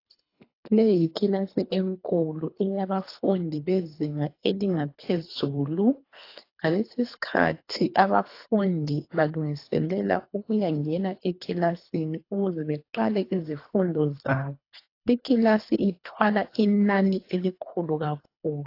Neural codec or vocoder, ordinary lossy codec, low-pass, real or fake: codec, 24 kHz, 3 kbps, HILCodec; AAC, 32 kbps; 5.4 kHz; fake